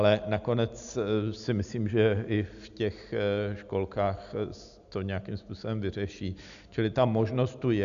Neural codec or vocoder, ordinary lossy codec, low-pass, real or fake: none; AAC, 96 kbps; 7.2 kHz; real